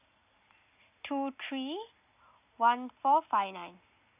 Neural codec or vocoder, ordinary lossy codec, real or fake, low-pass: none; none; real; 3.6 kHz